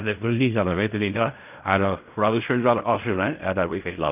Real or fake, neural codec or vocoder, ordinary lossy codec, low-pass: fake; codec, 16 kHz in and 24 kHz out, 0.4 kbps, LongCat-Audio-Codec, fine tuned four codebook decoder; none; 3.6 kHz